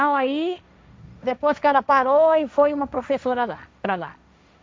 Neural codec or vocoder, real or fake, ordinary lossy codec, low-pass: codec, 16 kHz, 1.1 kbps, Voila-Tokenizer; fake; none; none